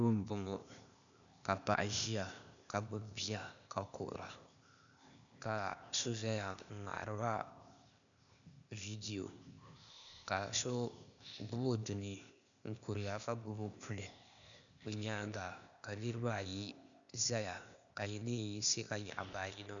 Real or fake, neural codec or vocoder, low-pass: fake; codec, 16 kHz, 0.8 kbps, ZipCodec; 7.2 kHz